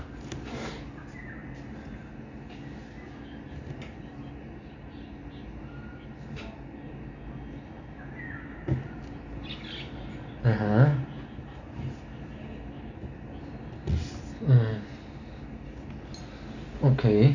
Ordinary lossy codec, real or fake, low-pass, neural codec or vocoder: none; real; 7.2 kHz; none